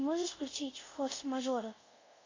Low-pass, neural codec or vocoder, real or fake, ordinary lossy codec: 7.2 kHz; codec, 24 kHz, 0.5 kbps, DualCodec; fake; AAC, 48 kbps